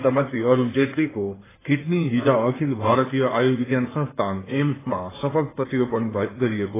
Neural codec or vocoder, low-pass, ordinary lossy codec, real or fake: codec, 16 kHz in and 24 kHz out, 2.2 kbps, FireRedTTS-2 codec; 3.6 kHz; AAC, 16 kbps; fake